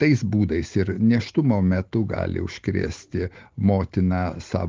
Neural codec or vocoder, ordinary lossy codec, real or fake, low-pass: none; Opus, 16 kbps; real; 7.2 kHz